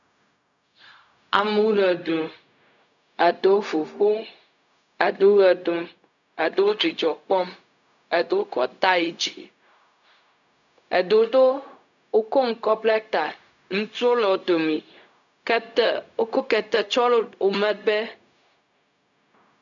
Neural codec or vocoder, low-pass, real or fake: codec, 16 kHz, 0.4 kbps, LongCat-Audio-Codec; 7.2 kHz; fake